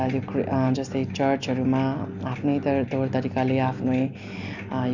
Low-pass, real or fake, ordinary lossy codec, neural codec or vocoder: 7.2 kHz; real; none; none